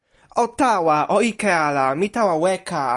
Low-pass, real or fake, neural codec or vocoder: 10.8 kHz; real; none